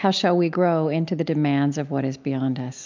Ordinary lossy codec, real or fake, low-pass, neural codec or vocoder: MP3, 64 kbps; real; 7.2 kHz; none